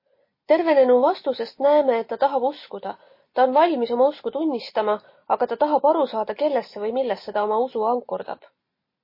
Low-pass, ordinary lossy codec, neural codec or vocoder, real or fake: 5.4 kHz; MP3, 24 kbps; none; real